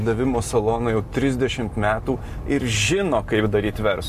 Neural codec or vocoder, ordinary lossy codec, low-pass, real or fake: none; MP3, 64 kbps; 14.4 kHz; real